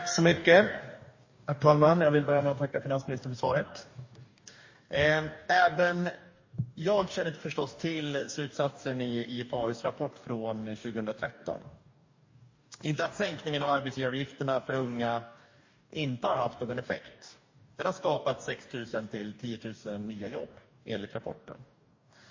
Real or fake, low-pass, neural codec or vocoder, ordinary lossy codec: fake; 7.2 kHz; codec, 44.1 kHz, 2.6 kbps, DAC; MP3, 32 kbps